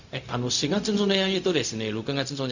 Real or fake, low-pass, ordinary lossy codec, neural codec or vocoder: fake; 7.2 kHz; Opus, 64 kbps; codec, 16 kHz, 0.4 kbps, LongCat-Audio-Codec